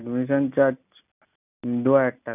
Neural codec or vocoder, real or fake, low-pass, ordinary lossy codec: none; real; 3.6 kHz; none